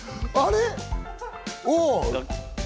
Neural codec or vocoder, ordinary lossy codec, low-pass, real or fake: none; none; none; real